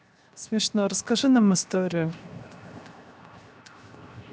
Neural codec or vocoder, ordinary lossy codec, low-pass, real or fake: codec, 16 kHz, 0.7 kbps, FocalCodec; none; none; fake